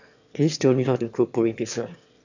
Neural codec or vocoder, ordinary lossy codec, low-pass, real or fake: autoencoder, 22.05 kHz, a latent of 192 numbers a frame, VITS, trained on one speaker; none; 7.2 kHz; fake